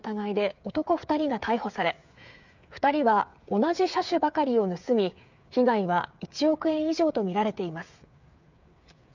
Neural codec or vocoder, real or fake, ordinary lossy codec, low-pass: codec, 16 kHz, 8 kbps, FreqCodec, smaller model; fake; none; 7.2 kHz